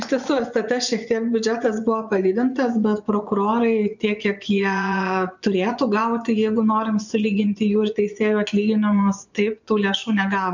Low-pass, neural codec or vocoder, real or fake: 7.2 kHz; vocoder, 24 kHz, 100 mel bands, Vocos; fake